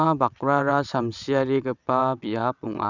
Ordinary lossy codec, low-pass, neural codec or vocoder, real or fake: none; 7.2 kHz; vocoder, 22.05 kHz, 80 mel bands, WaveNeXt; fake